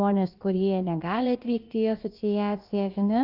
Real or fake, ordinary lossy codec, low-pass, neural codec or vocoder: fake; Opus, 24 kbps; 5.4 kHz; codec, 16 kHz, about 1 kbps, DyCAST, with the encoder's durations